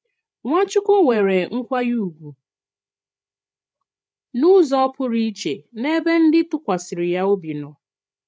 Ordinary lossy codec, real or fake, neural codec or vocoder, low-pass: none; fake; codec, 16 kHz, 16 kbps, FreqCodec, larger model; none